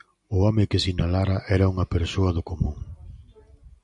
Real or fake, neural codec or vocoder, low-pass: real; none; 10.8 kHz